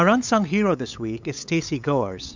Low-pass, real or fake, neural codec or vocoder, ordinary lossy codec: 7.2 kHz; fake; codec, 16 kHz, 8 kbps, FunCodec, trained on LibriTTS, 25 frames a second; MP3, 64 kbps